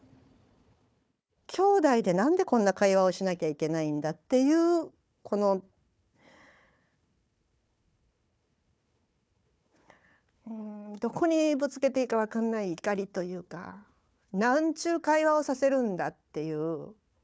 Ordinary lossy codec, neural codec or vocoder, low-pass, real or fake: none; codec, 16 kHz, 4 kbps, FunCodec, trained on Chinese and English, 50 frames a second; none; fake